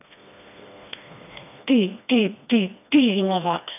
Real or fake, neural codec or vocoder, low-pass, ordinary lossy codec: fake; codec, 16 kHz, 2 kbps, FreqCodec, smaller model; 3.6 kHz; none